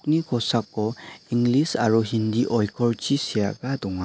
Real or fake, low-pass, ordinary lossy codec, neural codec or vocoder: real; none; none; none